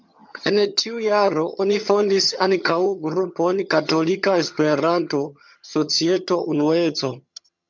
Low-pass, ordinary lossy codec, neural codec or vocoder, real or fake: 7.2 kHz; MP3, 64 kbps; vocoder, 22.05 kHz, 80 mel bands, HiFi-GAN; fake